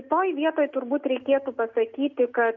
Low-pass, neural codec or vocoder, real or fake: 7.2 kHz; none; real